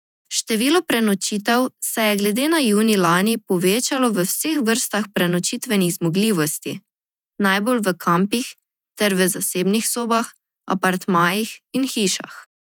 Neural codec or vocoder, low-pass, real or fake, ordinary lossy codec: vocoder, 48 kHz, 128 mel bands, Vocos; 19.8 kHz; fake; none